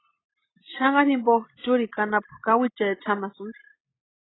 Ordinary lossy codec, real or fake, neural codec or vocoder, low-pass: AAC, 16 kbps; real; none; 7.2 kHz